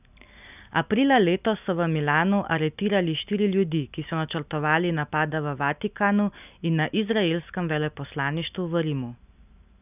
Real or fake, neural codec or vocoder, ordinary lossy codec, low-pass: real; none; none; 3.6 kHz